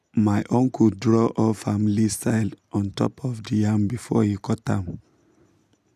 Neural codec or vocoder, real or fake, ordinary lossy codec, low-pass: none; real; none; 14.4 kHz